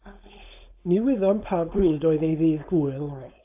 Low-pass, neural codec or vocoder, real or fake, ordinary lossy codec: 3.6 kHz; codec, 16 kHz, 4.8 kbps, FACodec; fake; AAC, 32 kbps